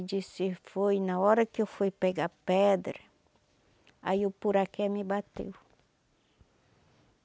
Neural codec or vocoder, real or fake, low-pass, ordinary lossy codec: none; real; none; none